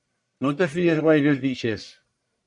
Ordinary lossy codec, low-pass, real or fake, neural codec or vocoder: MP3, 96 kbps; 10.8 kHz; fake; codec, 44.1 kHz, 1.7 kbps, Pupu-Codec